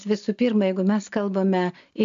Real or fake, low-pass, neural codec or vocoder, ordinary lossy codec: real; 7.2 kHz; none; AAC, 64 kbps